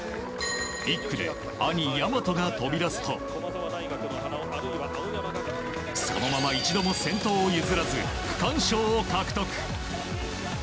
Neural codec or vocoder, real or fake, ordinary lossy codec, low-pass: none; real; none; none